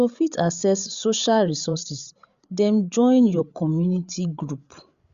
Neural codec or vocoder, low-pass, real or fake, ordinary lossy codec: codec, 16 kHz, 8 kbps, FreqCodec, larger model; 7.2 kHz; fake; Opus, 64 kbps